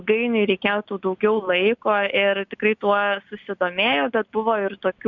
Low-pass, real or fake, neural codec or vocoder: 7.2 kHz; real; none